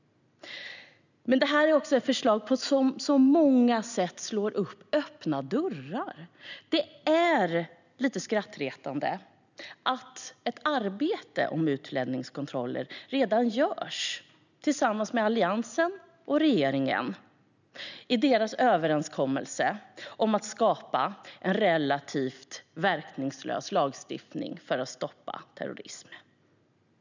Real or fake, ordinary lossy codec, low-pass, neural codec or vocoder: real; none; 7.2 kHz; none